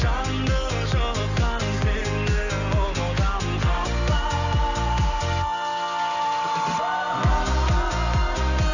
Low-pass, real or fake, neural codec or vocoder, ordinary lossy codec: 7.2 kHz; real; none; none